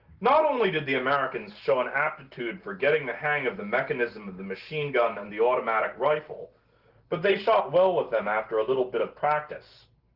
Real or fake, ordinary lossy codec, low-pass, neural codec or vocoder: real; Opus, 16 kbps; 5.4 kHz; none